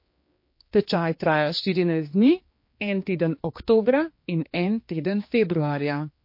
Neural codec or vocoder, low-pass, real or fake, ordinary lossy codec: codec, 16 kHz, 2 kbps, X-Codec, HuBERT features, trained on general audio; 5.4 kHz; fake; MP3, 32 kbps